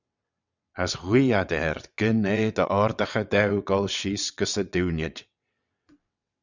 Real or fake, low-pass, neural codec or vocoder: fake; 7.2 kHz; vocoder, 22.05 kHz, 80 mel bands, WaveNeXt